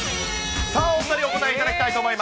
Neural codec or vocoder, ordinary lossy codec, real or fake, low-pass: none; none; real; none